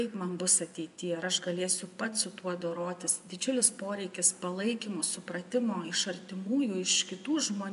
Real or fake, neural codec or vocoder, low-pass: fake; vocoder, 24 kHz, 100 mel bands, Vocos; 10.8 kHz